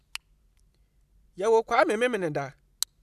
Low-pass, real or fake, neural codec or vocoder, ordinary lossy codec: 14.4 kHz; real; none; none